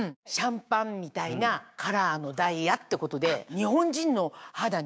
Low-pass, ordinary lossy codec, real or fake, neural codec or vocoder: none; none; fake; codec, 16 kHz, 6 kbps, DAC